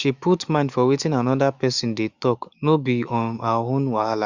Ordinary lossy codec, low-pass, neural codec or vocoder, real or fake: Opus, 64 kbps; 7.2 kHz; autoencoder, 48 kHz, 128 numbers a frame, DAC-VAE, trained on Japanese speech; fake